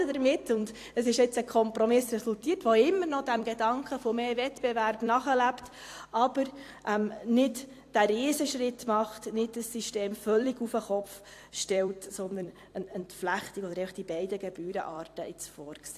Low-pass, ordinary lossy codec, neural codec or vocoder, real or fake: 14.4 kHz; AAC, 64 kbps; vocoder, 44.1 kHz, 128 mel bands every 256 samples, BigVGAN v2; fake